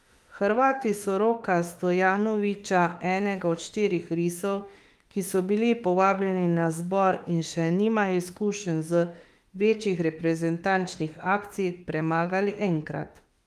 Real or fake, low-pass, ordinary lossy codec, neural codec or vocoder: fake; 14.4 kHz; Opus, 32 kbps; autoencoder, 48 kHz, 32 numbers a frame, DAC-VAE, trained on Japanese speech